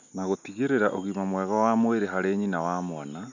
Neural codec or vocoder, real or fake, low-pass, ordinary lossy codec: none; real; 7.2 kHz; none